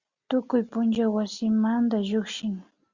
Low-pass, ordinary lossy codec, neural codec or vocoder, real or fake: 7.2 kHz; Opus, 64 kbps; none; real